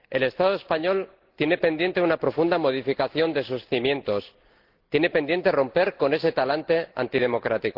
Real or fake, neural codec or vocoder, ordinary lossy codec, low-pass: real; none; Opus, 16 kbps; 5.4 kHz